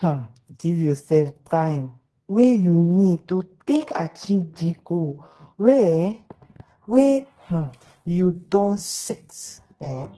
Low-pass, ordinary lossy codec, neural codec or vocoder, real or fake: 10.8 kHz; Opus, 16 kbps; codec, 24 kHz, 0.9 kbps, WavTokenizer, medium music audio release; fake